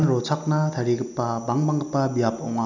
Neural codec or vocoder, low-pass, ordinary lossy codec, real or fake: none; 7.2 kHz; none; real